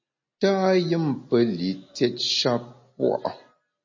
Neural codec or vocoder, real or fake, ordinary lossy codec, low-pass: none; real; MP3, 32 kbps; 7.2 kHz